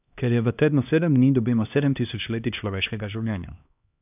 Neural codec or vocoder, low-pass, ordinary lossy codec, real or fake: codec, 16 kHz, 1 kbps, X-Codec, HuBERT features, trained on LibriSpeech; 3.6 kHz; none; fake